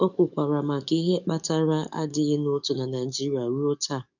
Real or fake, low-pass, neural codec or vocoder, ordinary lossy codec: fake; 7.2 kHz; codec, 44.1 kHz, 7.8 kbps, DAC; none